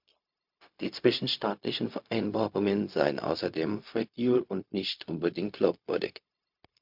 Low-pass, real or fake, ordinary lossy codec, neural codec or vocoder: 5.4 kHz; fake; MP3, 48 kbps; codec, 16 kHz, 0.4 kbps, LongCat-Audio-Codec